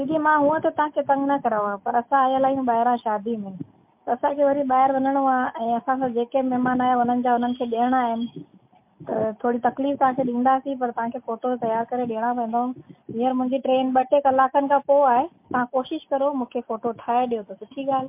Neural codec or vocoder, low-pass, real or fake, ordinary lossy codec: none; 3.6 kHz; real; MP3, 32 kbps